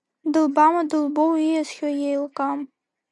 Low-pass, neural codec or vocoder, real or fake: 10.8 kHz; none; real